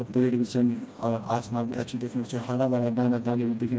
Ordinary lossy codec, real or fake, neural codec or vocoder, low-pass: none; fake; codec, 16 kHz, 1 kbps, FreqCodec, smaller model; none